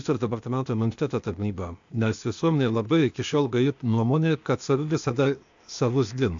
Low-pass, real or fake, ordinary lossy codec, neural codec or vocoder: 7.2 kHz; fake; AAC, 64 kbps; codec, 16 kHz, 0.8 kbps, ZipCodec